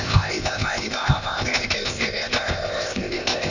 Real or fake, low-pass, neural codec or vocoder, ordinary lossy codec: fake; 7.2 kHz; codec, 16 kHz, 0.8 kbps, ZipCodec; none